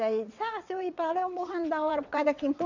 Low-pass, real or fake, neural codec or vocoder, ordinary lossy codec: 7.2 kHz; fake; vocoder, 22.05 kHz, 80 mel bands, WaveNeXt; none